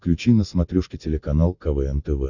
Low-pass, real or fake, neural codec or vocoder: 7.2 kHz; real; none